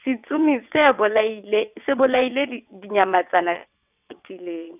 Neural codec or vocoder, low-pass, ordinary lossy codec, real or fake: vocoder, 22.05 kHz, 80 mel bands, WaveNeXt; 3.6 kHz; none; fake